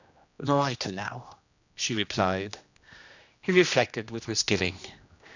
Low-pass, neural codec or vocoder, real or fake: 7.2 kHz; codec, 16 kHz, 1 kbps, X-Codec, HuBERT features, trained on general audio; fake